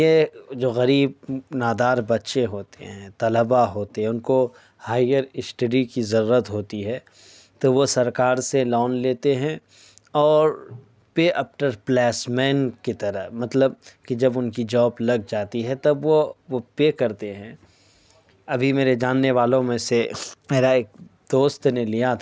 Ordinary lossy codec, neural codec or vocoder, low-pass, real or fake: none; none; none; real